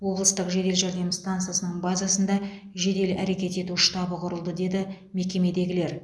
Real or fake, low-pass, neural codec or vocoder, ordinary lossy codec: real; none; none; none